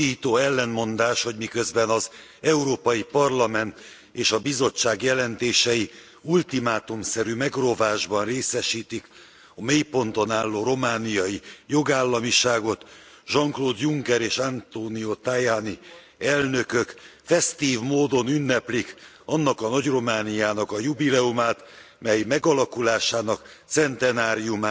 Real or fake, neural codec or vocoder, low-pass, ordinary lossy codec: real; none; none; none